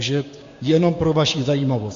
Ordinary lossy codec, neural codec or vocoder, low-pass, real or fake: AAC, 48 kbps; codec, 16 kHz, 6 kbps, DAC; 7.2 kHz; fake